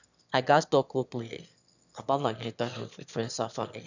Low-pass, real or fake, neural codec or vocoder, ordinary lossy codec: 7.2 kHz; fake; autoencoder, 22.05 kHz, a latent of 192 numbers a frame, VITS, trained on one speaker; none